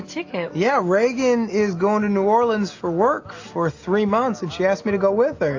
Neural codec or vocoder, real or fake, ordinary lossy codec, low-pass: none; real; AAC, 48 kbps; 7.2 kHz